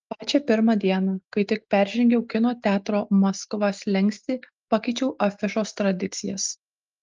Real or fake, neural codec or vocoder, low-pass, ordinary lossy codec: real; none; 7.2 kHz; Opus, 24 kbps